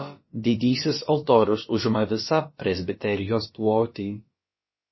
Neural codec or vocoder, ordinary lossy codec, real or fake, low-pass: codec, 16 kHz, about 1 kbps, DyCAST, with the encoder's durations; MP3, 24 kbps; fake; 7.2 kHz